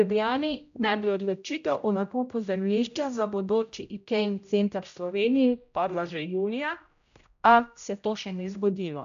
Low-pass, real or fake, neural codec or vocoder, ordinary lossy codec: 7.2 kHz; fake; codec, 16 kHz, 0.5 kbps, X-Codec, HuBERT features, trained on general audio; AAC, 96 kbps